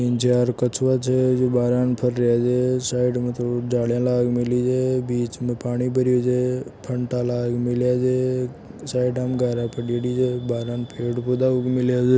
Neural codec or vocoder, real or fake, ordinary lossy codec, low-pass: none; real; none; none